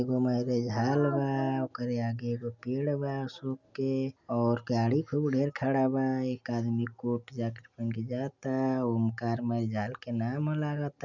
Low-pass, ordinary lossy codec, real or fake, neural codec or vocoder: 7.2 kHz; none; real; none